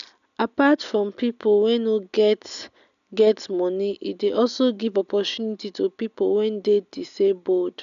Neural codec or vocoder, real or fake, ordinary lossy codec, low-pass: none; real; none; 7.2 kHz